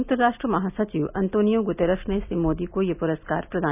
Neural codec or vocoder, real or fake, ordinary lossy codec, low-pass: none; real; none; 3.6 kHz